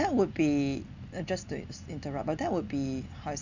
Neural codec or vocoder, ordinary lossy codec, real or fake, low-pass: none; none; real; 7.2 kHz